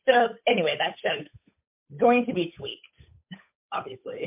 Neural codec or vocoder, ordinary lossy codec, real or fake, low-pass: codec, 16 kHz, 8 kbps, FunCodec, trained on Chinese and English, 25 frames a second; MP3, 32 kbps; fake; 3.6 kHz